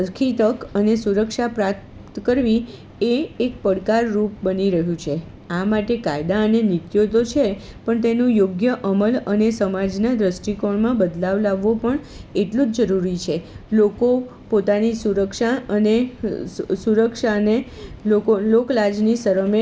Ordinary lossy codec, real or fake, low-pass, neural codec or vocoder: none; real; none; none